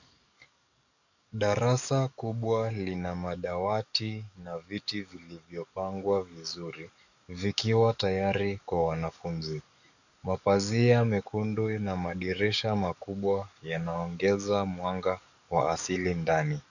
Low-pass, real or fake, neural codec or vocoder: 7.2 kHz; fake; codec, 44.1 kHz, 7.8 kbps, DAC